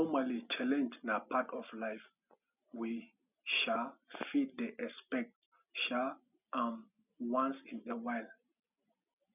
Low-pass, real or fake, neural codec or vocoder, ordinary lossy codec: 3.6 kHz; real; none; none